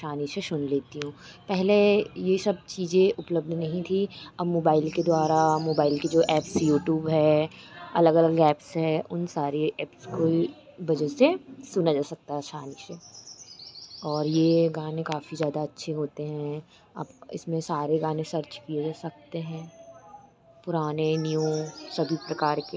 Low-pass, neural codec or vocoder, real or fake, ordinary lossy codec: none; none; real; none